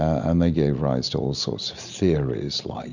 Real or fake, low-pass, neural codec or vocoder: real; 7.2 kHz; none